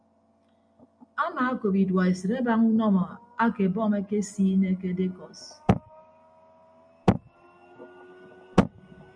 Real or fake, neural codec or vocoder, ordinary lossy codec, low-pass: real; none; MP3, 64 kbps; 9.9 kHz